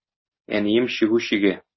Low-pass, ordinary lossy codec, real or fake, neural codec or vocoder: 7.2 kHz; MP3, 24 kbps; real; none